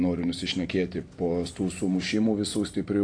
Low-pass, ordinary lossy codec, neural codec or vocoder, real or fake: 9.9 kHz; AAC, 48 kbps; none; real